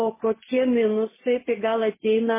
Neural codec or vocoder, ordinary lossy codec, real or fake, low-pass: none; MP3, 16 kbps; real; 3.6 kHz